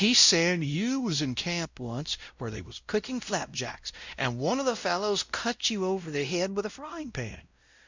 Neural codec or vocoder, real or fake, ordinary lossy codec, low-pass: codec, 16 kHz, 0.5 kbps, X-Codec, WavLM features, trained on Multilingual LibriSpeech; fake; Opus, 64 kbps; 7.2 kHz